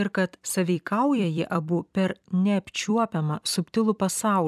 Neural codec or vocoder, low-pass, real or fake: vocoder, 44.1 kHz, 128 mel bands every 256 samples, BigVGAN v2; 14.4 kHz; fake